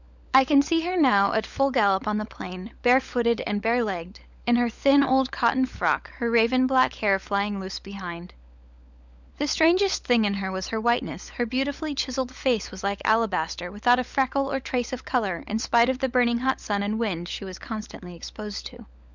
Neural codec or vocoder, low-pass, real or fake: codec, 16 kHz, 16 kbps, FunCodec, trained on LibriTTS, 50 frames a second; 7.2 kHz; fake